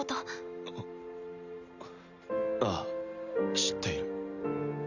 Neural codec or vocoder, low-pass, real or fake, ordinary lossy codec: none; 7.2 kHz; real; none